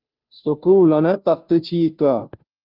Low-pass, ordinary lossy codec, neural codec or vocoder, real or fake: 5.4 kHz; Opus, 24 kbps; codec, 16 kHz, 0.5 kbps, FunCodec, trained on Chinese and English, 25 frames a second; fake